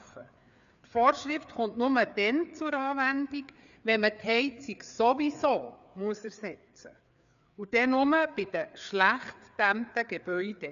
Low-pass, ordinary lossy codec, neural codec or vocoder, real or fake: 7.2 kHz; none; codec, 16 kHz, 4 kbps, FreqCodec, larger model; fake